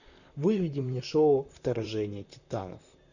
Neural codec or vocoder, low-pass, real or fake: vocoder, 44.1 kHz, 128 mel bands, Pupu-Vocoder; 7.2 kHz; fake